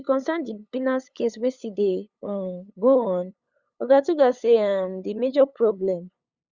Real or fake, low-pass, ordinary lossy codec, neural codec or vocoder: fake; 7.2 kHz; Opus, 64 kbps; codec, 16 kHz, 8 kbps, FunCodec, trained on LibriTTS, 25 frames a second